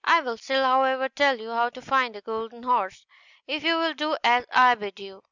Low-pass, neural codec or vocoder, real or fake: 7.2 kHz; none; real